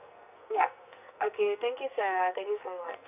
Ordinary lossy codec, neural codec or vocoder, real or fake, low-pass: none; codec, 44.1 kHz, 2.6 kbps, SNAC; fake; 3.6 kHz